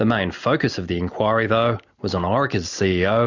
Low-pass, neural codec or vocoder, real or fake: 7.2 kHz; none; real